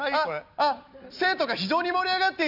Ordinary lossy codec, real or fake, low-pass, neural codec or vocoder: none; real; 5.4 kHz; none